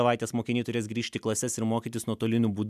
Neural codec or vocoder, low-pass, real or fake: none; 14.4 kHz; real